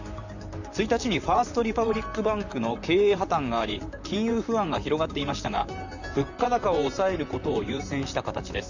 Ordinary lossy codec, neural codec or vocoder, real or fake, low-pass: none; vocoder, 44.1 kHz, 128 mel bands, Pupu-Vocoder; fake; 7.2 kHz